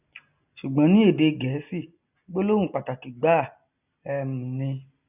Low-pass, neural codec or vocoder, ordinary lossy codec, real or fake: 3.6 kHz; none; none; real